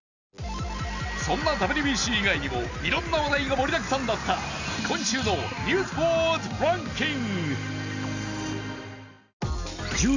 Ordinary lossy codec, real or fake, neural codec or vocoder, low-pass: none; real; none; 7.2 kHz